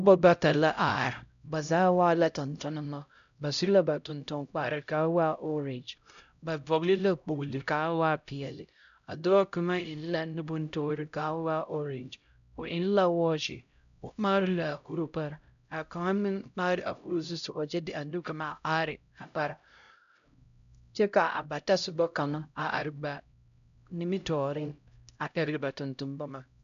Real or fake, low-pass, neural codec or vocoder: fake; 7.2 kHz; codec, 16 kHz, 0.5 kbps, X-Codec, HuBERT features, trained on LibriSpeech